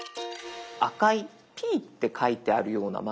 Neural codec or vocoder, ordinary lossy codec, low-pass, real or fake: none; none; none; real